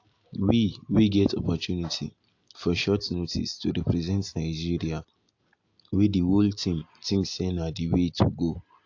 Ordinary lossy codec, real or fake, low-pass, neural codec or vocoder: AAC, 48 kbps; real; 7.2 kHz; none